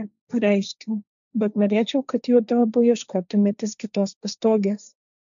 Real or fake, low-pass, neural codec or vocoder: fake; 7.2 kHz; codec, 16 kHz, 1.1 kbps, Voila-Tokenizer